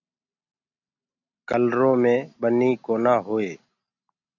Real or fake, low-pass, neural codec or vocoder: real; 7.2 kHz; none